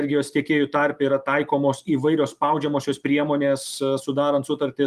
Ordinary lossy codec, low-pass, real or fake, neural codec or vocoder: Opus, 32 kbps; 14.4 kHz; real; none